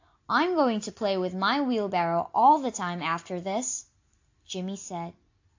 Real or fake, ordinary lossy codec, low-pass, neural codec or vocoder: real; AAC, 48 kbps; 7.2 kHz; none